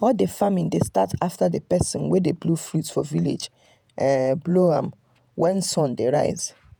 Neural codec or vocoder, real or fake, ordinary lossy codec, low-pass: vocoder, 48 kHz, 128 mel bands, Vocos; fake; none; none